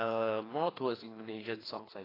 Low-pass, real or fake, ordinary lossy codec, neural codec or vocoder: 5.4 kHz; fake; AAC, 24 kbps; codec, 16 kHz, 2 kbps, FreqCodec, larger model